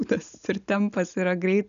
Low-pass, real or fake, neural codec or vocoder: 7.2 kHz; real; none